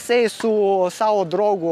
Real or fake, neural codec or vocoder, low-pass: real; none; 14.4 kHz